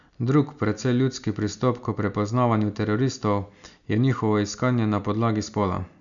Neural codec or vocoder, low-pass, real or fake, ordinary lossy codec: none; 7.2 kHz; real; none